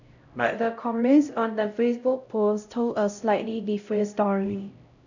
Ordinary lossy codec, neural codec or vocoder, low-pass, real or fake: none; codec, 16 kHz, 0.5 kbps, X-Codec, HuBERT features, trained on LibriSpeech; 7.2 kHz; fake